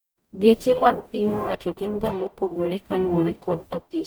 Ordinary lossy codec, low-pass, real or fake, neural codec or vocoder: none; none; fake; codec, 44.1 kHz, 0.9 kbps, DAC